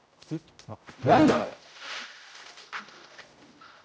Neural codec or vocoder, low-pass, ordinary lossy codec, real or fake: codec, 16 kHz, 0.5 kbps, X-Codec, HuBERT features, trained on general audio; none; none; fake